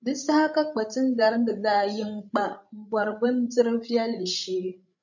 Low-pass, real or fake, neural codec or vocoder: 7.2 kHz; fake; codec, 16 kHz, 16 kbps, FreqCodec, larger model